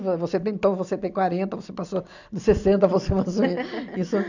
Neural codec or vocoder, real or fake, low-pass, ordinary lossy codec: none; real; 7.2 kHz; none